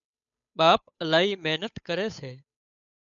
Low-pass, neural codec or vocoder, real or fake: 7.2 kHz; codec, 16 kHz, 8 kbps, FunCodec, trained on Chinese and English, 25 frames a second; fake